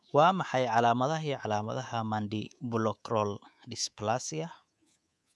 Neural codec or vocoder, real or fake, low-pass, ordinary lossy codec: codec, 24 kHz, 3.1 kbps, DualCodec; fake; none; none